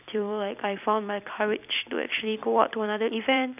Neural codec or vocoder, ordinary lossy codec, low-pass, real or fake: vocoder, 44.1 kHz, 128 mel bands every 256 samples, BigVGAN v2; none; 3.6 kHz; fake